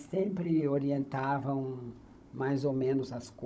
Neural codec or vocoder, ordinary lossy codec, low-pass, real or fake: codec, 16 kHz, 16 kbps, FunCodec, trained on Chinese and English, 50 frames a second; none; none; fake